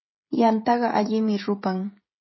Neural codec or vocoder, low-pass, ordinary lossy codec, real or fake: codec, 16 kHz, 16 kbps, FreqCodec, smaller model; 7.2 kHz; MP3, 24 kbps; fake